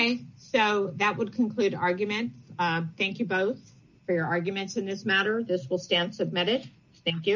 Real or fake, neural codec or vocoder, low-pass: real; none; 7.2 kHz